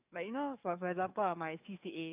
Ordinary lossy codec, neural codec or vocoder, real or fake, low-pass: none; codec, 44.1 kHz, 7.8 kbps, DAC; fake; 3.6 kHz